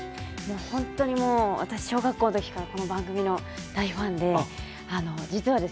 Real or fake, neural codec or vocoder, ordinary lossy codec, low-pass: real; none; none; none